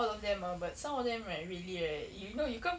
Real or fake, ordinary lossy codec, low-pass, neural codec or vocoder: real; none; none; none